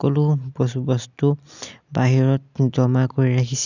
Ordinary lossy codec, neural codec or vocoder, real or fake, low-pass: none; none; real; 7.2 kHz